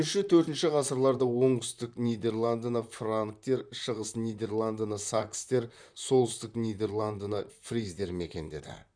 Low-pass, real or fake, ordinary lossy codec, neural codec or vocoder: 9.9 kHz; fake; none; vocoder, 44.1 kHz, 128 mel bands, Pupu-Vocoder